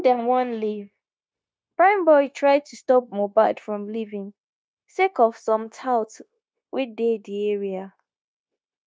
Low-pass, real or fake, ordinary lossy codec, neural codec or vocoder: none; fake; none; codec, 16 kHz, 0.9 kbps, LongCat-Audio-Codec